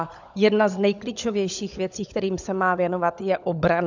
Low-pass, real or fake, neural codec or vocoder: 7.2 kHz; fake; codec, 16 kHz, 16 kbps, FunCodec, trained on LibriTTS, 50 frames a second